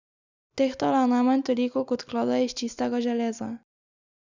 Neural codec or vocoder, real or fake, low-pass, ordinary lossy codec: none; real; 7.2 kHz; Opus, 64 kbps